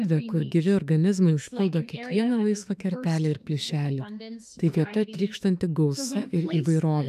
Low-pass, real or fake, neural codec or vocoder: 14.4 kHz; fake; autoencoder, 48 kHz, 32 numbers a frame, DAC-VAE, trained on Japanese speech